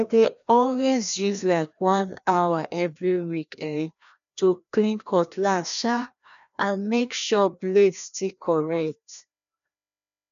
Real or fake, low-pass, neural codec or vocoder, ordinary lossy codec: fake; 7.2 kHz; codec, 16 kHz, 1 kbps, FreqCodec, larger model; none